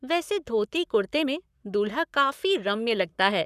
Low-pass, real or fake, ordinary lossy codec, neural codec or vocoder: 14.4 kHz; fake; none; codec, 44.1 kHz, 7.8 kbps, Pupu-Codec